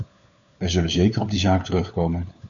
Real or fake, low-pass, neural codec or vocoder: fake; 7.2 kHz; codec, 16 kHz, 4 kbps, FunCodec, trained on LibriTTS, 50 frames a second